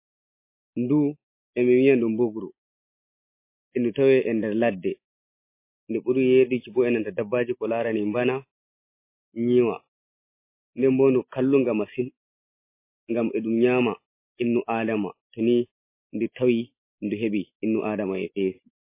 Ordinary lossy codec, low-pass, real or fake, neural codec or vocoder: MP3, 24 kbps; 3.6 kHz; real; none